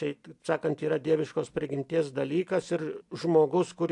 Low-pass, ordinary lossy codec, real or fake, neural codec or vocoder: 10.8 kHz; AAC, 64 kbps; real; none